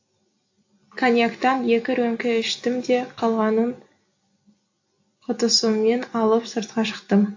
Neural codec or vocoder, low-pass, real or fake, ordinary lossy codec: none; 7.2 kHz; real; AAC, 48 kbps